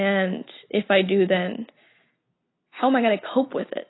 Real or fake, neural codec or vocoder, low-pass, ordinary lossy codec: real; none; 7.2 kHz; AAC, 16 kbps